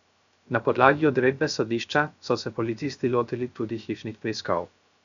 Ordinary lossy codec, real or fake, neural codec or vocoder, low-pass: none; fake; codec, 16 kHz, 0.3 kbps, FocalCodec; 7.2 kHz